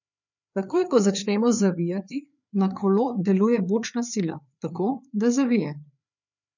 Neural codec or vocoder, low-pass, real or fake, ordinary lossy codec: codec, 16 kHz, 4 kbps, FreqCodec, larger model; 7.2 kHz; fake; none